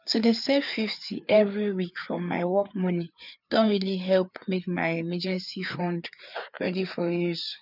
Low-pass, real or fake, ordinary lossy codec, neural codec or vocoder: 5.4 kHz; fake; none; codec, 16 kHz, 4 kbps, FreqCodec, larger model